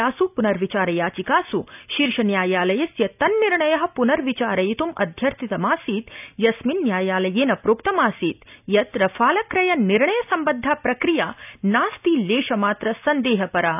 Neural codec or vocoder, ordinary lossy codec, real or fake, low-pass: none; none; real; 3.6 kHz